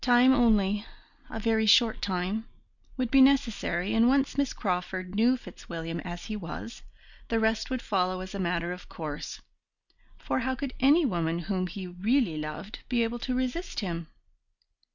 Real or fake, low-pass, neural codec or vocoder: real; 7.2 kHz; none